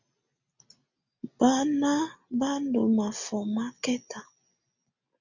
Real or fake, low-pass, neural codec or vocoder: real; 7.2 kHz; none